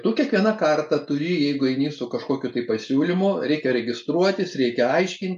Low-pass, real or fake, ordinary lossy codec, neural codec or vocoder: 10.8 kHz; real; MP3, 96 kbps; none